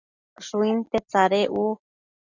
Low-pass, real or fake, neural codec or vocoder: 7.2 kHz; real; none